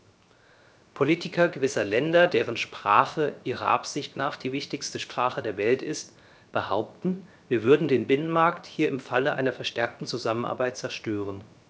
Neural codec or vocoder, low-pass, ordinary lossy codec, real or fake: codec, 16 kHz, 0.7 kbps, FocalCodec; none; none; fake